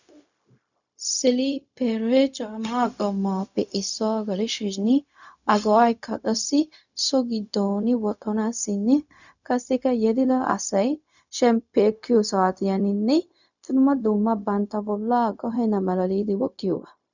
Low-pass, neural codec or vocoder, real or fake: 7.2 kHz; codec, 16 kHz, 0.4 kbps, LongCat-Audio-Codec; fake